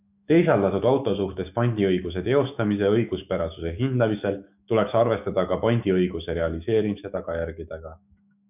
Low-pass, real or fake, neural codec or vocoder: 3.6 kHz; fake; autoencoder, 48 kHz, 128 numbers a frame, DAC-VAE, trained on Japanese speech